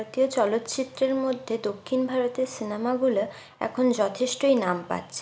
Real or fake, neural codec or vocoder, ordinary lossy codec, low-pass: real; none; none; none